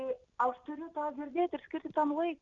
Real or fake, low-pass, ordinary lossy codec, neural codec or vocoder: real; 7.2 kHz; Opus, 64 kbps; none